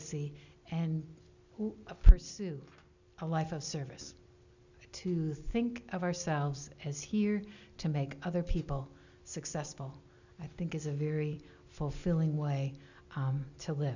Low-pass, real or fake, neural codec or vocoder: 7.2 kHz; real; none